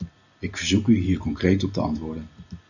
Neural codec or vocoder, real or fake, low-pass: none; real; 7.2 kHz